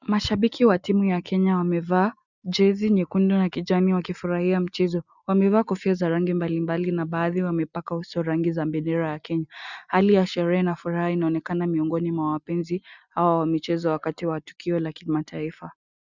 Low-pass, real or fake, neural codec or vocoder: 7.2 kHz; real; none